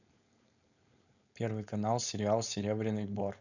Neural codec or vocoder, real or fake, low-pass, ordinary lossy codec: codec, 16 kHz, 4.8 kbps, FACodec; fake; 7.2 kHz; none